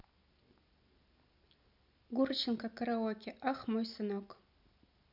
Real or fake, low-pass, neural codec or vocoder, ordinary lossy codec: real; 5.4 kHz; none; none